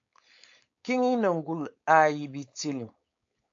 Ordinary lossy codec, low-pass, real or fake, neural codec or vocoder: AAC, 48 kbps; 7.2 kHz; fake; codec, 16 kHz, 4.8 kbps, FACodec